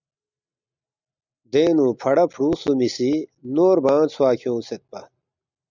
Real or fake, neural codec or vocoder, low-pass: real; none; 7.2 kHz